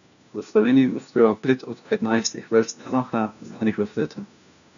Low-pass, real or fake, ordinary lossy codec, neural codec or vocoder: 7.2 kHz; fake; none; codec, 16 kHz, 1 kbps, FunCodec, trained on LibriTTS, 50 frames a second